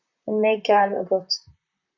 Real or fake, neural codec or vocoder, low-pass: fake; vocoder, 44.1 kHz, 128 mel bands, Pupu-Vocoder; 7.2 kHz